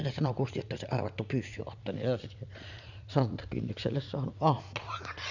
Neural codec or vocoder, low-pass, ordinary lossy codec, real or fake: vocoder, 44.1 kHz, 80 mel bands, Vocos; 7.2 kHz; none; fake